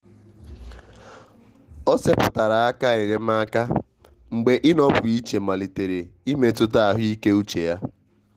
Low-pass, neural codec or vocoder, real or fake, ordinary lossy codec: 14.4 kHz; none; real; Opus, 16 kbps